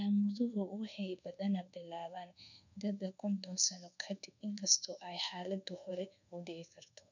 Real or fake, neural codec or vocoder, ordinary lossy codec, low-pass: fake; codec, 24 kHz, 1.2 kbps, DualCodec; none; 7.2 kHz